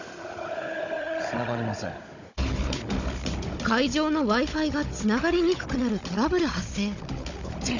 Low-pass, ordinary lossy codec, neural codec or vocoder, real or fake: 7.2 kHz; none; codec, 16 kHz, 16 kbps, FunCodec, trained on Chinese and English, 50 frames a second; fake